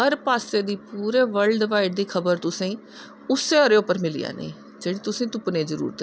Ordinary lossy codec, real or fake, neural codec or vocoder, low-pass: none; real; none; none